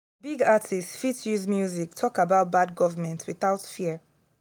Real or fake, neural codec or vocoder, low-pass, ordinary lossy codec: real; none; none; none